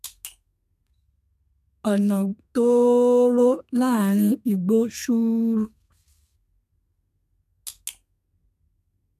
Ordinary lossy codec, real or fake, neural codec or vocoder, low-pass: none; fake; codec, 32 kHz, 1.9 kbps, SNAC; 14.4 kHz